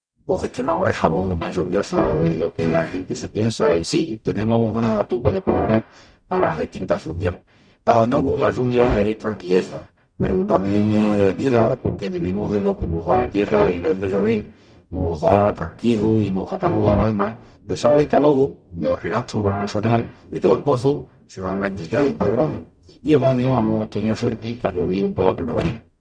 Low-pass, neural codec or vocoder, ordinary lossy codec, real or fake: 9.9 kHz; codec, 44.1 kHz, 0.9 kbps, DAC; Opus, 64 kbps; fake